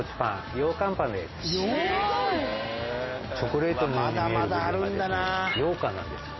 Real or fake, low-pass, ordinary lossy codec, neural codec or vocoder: real; 7.2 kHz; MP3, 24 kbps; none